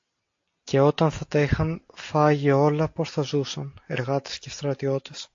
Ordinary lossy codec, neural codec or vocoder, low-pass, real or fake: AAC, 48 kbps; none; 7.2 kHz; real